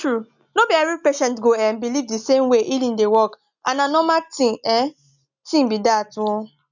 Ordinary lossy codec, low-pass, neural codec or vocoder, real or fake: none; 7.2 kHz; none; real